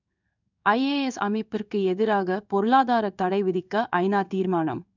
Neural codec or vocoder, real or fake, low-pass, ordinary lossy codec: codec, 16 kHz in and 24 kHz out, 1 kbps, XY-Tokenizer; fake; 7.2 kHz; none